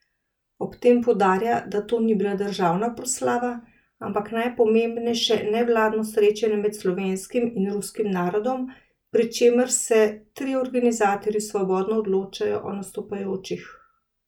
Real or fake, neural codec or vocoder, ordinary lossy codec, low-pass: real; none; none; 19.8 kHz